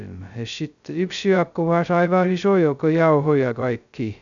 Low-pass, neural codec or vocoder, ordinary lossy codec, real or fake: 7.2 kHz; codec, 16 kHz, 0.2 kbps, FocalCodec; none; fake